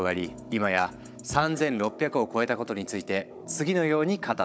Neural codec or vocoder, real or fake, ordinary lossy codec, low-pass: codec, 16 kHz, 16 kbps, FunCodec, trained on Chinese and English, 50 frames a second; fake; none; none